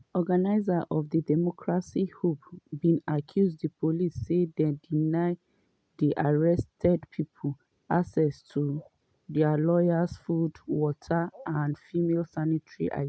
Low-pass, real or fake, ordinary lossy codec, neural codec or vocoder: none; real; none; none